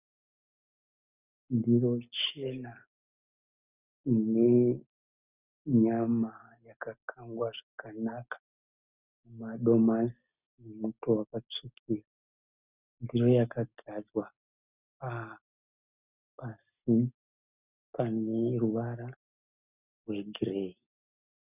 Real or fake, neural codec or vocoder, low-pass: real; none; 3.6 kHz